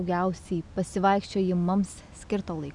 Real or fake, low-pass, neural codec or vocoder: real; 10.8 kHz; none